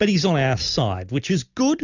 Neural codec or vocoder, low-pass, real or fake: none; 7.2 kHz; real